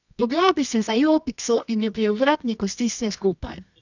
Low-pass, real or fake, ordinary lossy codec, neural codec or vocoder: 7.2 kHz; fake; none; codec, 24 kHz, 0.9 kbps, WavTokenizer, medium music audio release